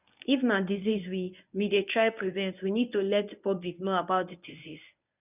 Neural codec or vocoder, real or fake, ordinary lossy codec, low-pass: codec, 24 kHz, 0.9 kbps, WavTokenizer, medium speech release version 1; fake; none; 3.6 kHz